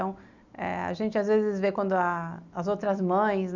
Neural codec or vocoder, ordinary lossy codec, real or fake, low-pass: none; none; real; 7.2 kHz